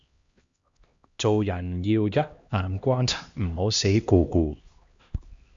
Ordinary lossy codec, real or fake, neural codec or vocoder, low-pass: Opus, 64 kbps; fake; codec, 16 kHz, 1 kbps, X-Codec, HuBERT features, trained on LibriSpeech; 7.2 kHz